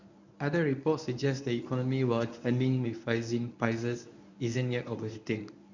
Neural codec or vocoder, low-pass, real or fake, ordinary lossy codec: codec, 24 kHz, 0.9 kbps, WavTokenizer, medium speech release version 1; 7.2 kHz; fake; none